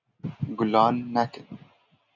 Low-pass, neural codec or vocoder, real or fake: 7.2 kHz; none; real